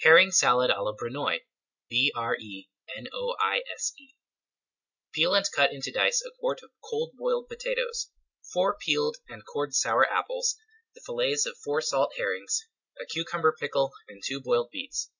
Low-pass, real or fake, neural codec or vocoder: 7.2 kHz; real; none